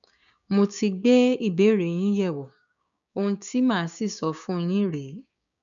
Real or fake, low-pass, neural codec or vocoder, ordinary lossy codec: fake; 7.2 kHz; codec, 16 kHz, 6 kbps, DAC; none